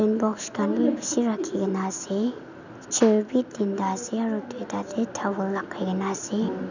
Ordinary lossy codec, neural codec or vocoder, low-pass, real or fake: none; none; 7.2 kHz; real